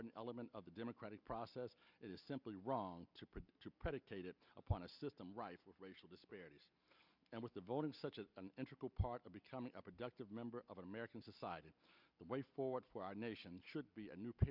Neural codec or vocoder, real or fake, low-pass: none; real; 5.4 kHz